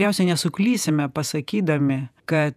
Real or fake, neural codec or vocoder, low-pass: fake; vocoder, 48 kHz, 128 mel bands, Vocos; 14.4 kHz